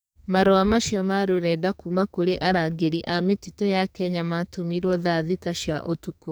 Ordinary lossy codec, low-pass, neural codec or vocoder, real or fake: none; none; codec, 44.1 kHz, 2.6 kbps, SNAC; fake